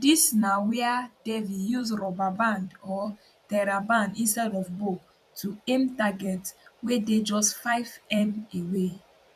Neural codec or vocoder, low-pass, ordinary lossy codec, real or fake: vocoder, 44.1 kHz, 128 mel bands every 256 samples, BigVGAN v2; 14.4 kHz; none; fake